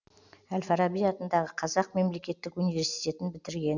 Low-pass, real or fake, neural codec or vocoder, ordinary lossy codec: 7.2 kHz; real; none; none